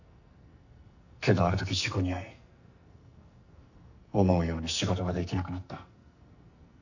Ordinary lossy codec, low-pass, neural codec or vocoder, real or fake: none; 7.2 kHz; codec, 44.1 kHz, 2.6 kbps, SNAC; fake